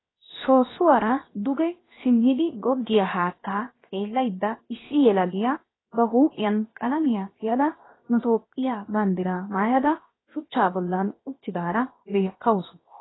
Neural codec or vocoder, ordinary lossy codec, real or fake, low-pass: codec, 16 kHz, 0.7 kbps, FocalCodec; AAC, 16 kbps; fake; 7.2 kHz